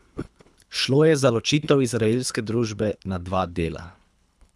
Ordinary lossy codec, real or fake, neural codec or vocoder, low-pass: none; fake; codec, 24 kHz, 3 kbps, HILCodec; none